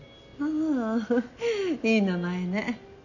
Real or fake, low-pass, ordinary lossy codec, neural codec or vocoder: real; 7.2 kHz; none; none